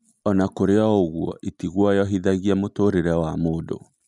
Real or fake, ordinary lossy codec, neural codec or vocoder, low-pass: real; none; none; 10.8 kHz